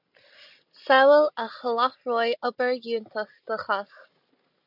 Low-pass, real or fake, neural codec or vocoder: 5.4 kHz; real; none